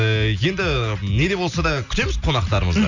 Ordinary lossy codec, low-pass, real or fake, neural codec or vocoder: none; 7.2 kHz; real; none